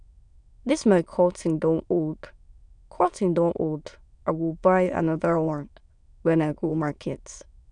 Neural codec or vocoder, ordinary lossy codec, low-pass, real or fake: autoencoder, 22.05 kHz, a latent of 192 numbers a frame, VITS, trained on many speakers; none; 9.9 kHz; fake